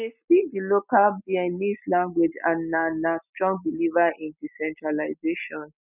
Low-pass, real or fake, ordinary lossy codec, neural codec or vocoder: 3.6 kHz; real; none; none